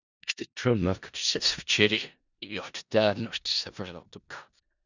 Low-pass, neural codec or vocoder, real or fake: 7.2 kHz; codec, 16 kHz in and 24 kHz out, 0.4 kbps, LongCat-Audio-Codec, four codebook decoder; fake